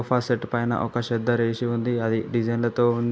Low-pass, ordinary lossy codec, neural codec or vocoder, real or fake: none; none; none; real